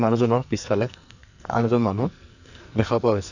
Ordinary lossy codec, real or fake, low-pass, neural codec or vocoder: none; fake; 7.2 kHz; codec, 44.1 kHz, 2.6 kbps, SNAC